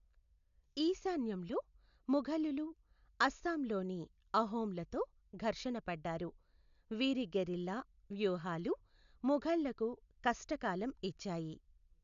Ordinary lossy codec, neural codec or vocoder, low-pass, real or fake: AAC, 96 kbps; none; 7.2 kHz; real